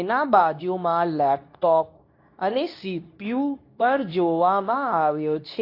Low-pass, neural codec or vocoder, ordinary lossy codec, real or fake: 5.4 kHz; codec, 24 kHz, 0.9 kbps, WavTokenizer, medium speech release version 2; AAC, 32 kbps; fake